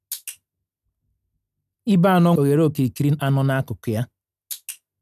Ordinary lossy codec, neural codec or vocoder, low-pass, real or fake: none; none; 14.4 kHz; real